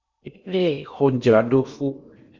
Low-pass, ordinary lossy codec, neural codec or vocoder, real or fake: 7.2 kHz; Opus, 64 kbps; codec, 16 kHz in and 24 kHz out, 0.8 kbps, FocalCodec, streaming, 65536 codes; fake